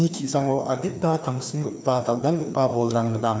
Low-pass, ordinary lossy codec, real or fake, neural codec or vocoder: none; none; fake; codec, 16 kHz, 2 kbps, FreqCodec, larger model